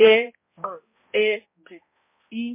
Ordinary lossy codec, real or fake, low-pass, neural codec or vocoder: MP3, 24 kbps; fake; 3.6 kHz; codec, 16 kHz, 0.5 kbps, X-Codec, HuBERT features, trained on balanced general audio